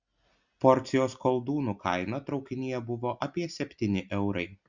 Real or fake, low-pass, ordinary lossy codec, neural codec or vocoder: real; 7.2 kHz; Opus, 64 kbps; none